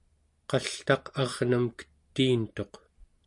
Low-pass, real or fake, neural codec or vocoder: 10.8 kHz; real; none